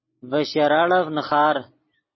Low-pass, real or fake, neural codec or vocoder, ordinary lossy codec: 7.2 kHz; real; none; MP3, 24 kbps